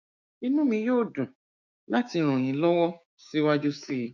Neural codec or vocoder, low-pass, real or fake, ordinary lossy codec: codec, 16 kHz, 6 kbps, DAC; 7.2 kHz; fake; none